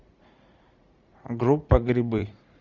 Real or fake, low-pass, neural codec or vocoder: fake; 7.2 kHz; vocoder, 44.1 kHz, 80 mel bands, Vocos